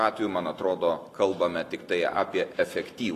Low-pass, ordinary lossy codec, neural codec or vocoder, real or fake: 14.4 kHz; AAC, 48 kbps; none; real